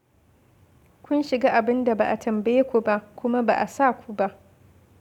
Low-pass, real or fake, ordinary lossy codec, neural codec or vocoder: 19.8 kHz; real; none; none